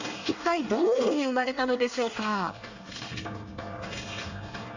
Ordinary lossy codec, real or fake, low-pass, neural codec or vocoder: Opus, 64 kbps; fake; 7.2 kHz; codec, 24 kHz, 1 kbps, SNAC